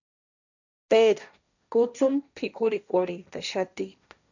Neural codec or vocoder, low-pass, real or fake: codec, 16 kHz, 1.1 kbps, Voila-Tokenizer; 7.2 kHz; fake